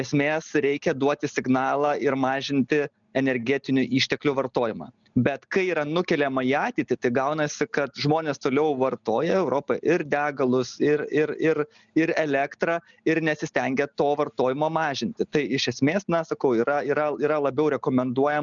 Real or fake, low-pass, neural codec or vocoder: real; 7.2 kHz; none